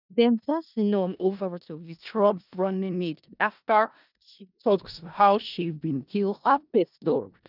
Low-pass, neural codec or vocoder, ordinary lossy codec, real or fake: 5.4 kHz; codec, 16 kHz in and 24 kHz out, 0.4 kbps, LongCat-Audio-Codec, four codebook decoder; none; fake